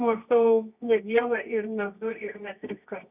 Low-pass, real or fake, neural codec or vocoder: 3.6 kHz; fake; codec, 24 kHz, 0.9 kbps, WavTokenizer, medium music audio release